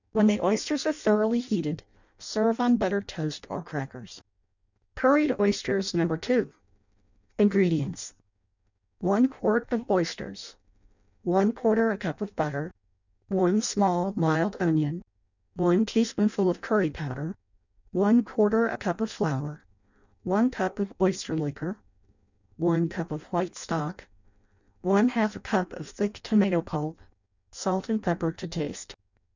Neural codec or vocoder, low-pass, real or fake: codec, 16 kHz in and 24 kHz out, 0.6 kbps, FireRedTTS-2 codec; 7.2 kHz; fake